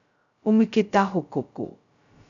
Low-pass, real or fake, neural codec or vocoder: 7.2 kHz; fake; codec, 16 kHz, 0.2 kbps, FocalCodec